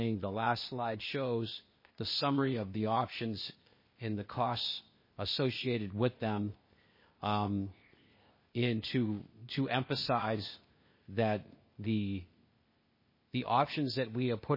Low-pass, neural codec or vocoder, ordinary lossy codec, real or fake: 5.4 kHz; codec, 16 kHz, 0.8 kbps, ZipCodec; MP3, 24 kbps; fake